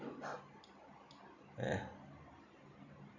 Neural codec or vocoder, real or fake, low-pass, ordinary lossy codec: none; real; 7.2 kHz; none